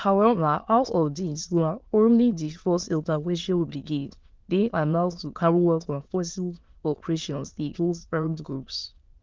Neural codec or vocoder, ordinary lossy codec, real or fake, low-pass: autoencoder, 22.05 kHz, a latent of 192 numbers a frame, VITS, trained on many speakers; Opus, 32 kbps; fake; 7.2 kHz